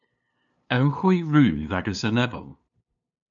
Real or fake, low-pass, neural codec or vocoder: fake; 7.2 kHz; codec, 16 kHz, 2 kbps, FunCodec, trained on LibriTTS, 25 frames a second